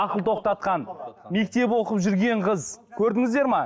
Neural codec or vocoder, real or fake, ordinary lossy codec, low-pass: none; real; none; none